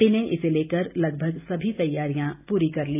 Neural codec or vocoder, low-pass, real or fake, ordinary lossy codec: none; 3.6 kHz; real; AAC, 32 kbps